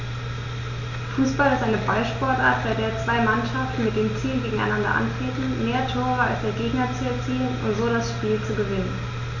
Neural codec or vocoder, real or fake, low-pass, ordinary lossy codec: none; real; 7.2 kHz; none